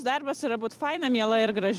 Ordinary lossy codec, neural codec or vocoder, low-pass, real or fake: Opus, 32 kbps; vocoder, 44.1 kHz, 128 mel bands every 256 samples, BigVGAN v2; 14.4 kHz; fake